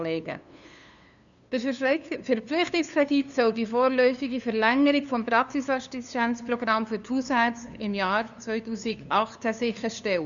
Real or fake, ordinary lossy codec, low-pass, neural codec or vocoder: fake; none; 7.2 kHz; codec, 16 kHz, 2 kbps, FunCodec, trained on LibriTTS, 25 frames a second